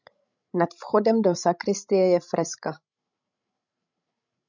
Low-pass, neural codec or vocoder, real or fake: 7.2 kHz; codec, 16 kHz, 16 kbps, FreqCodec, larger model; fake